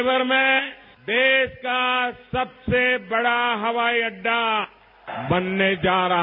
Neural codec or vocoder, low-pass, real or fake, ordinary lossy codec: none; 5.4 kHz; real; none